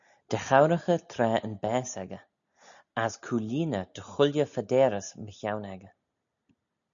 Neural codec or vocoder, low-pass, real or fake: none; 7.2 kHz; real